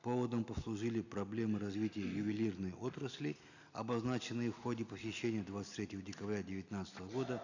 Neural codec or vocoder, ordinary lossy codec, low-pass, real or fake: none; none; 7.2 kHz; real